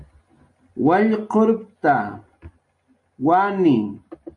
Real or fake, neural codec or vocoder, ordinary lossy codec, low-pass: real; none; MP3, 48 kbps; 10.8 kHz